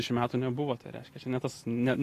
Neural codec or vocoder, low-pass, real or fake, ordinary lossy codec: none; 14.4 kHz; real; AAC, 48 kbps